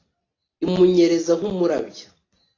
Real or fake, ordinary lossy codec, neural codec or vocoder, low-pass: real; AAC, 32 kbps; none; 7.2 kHz